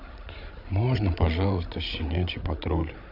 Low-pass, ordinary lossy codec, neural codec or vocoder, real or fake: 5.4 kHz; MP3, 48 kbps; codec, 16 kHz, 16 kbps, FreqCodec, larger model; fake